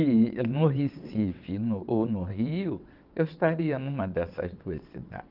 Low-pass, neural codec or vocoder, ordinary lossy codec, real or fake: 5.4 kHz; vocoder, 22.05 kHz, 80 mel bands, WaveNeXt; Opus, 24 kbps; fake